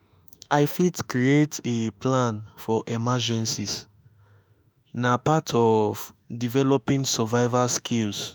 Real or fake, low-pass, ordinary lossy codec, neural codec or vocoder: fake; none; none; autoencoder, 48 kHz, 32 numbers a frame, DAC-VAE, trained on Japanese speech